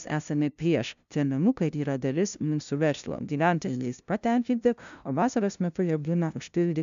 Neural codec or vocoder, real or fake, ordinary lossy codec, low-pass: codec, 16 kHz, 0.5 kbps, FunCodec, trained on LibriTTS, 25 frames a second; fake; AAC, 96 kbps; 7.2 kHz